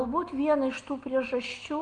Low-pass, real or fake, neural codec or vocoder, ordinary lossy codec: 10.8 kHz; real; none; Opus, 24 kbps